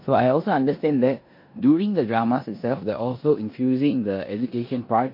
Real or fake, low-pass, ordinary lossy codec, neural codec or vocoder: fake; 5.4 kHz; MP3, 32 kbps; codec, 16 kHz in and 24 kHz out, 0.9 kbps, LongCat-Audio-Codec, four codebook decoder